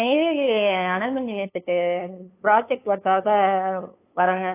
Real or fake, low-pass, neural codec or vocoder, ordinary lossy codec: fake; 3.6 kHz; codec, 16 kHz, 8 kbps, FunCodec, trained on LibriTTS, 25 frames a second; AAC, 24 kbps